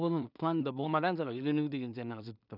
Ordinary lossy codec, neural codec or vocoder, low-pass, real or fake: none; codec, 16 kHz in and 24 kHz out, 0.4 kbps, LongCat-Audio-Codec, two codebook decoder; 5.4 kHz; fake